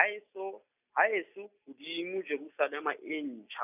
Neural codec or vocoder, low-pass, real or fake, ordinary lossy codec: none; 3.6 kHz; real; none